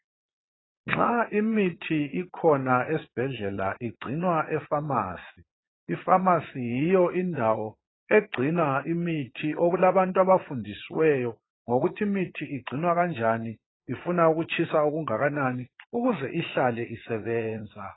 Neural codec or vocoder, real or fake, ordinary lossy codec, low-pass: vocoder, 22.05 kHz, 80 mel bands, Vocos; fake; AAC, 16 kbps; 7.2 kHz